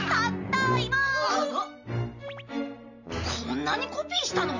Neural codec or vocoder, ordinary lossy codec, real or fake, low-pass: none; none; real; 7.2 kHz